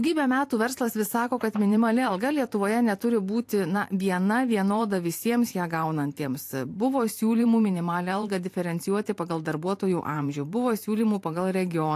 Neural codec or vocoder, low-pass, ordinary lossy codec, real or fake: vocoder, 44.1 kHz, 128 mel bands every 512 samples, BigVGAN v2; 14.4 kHz; AAC, 64 kbps; fake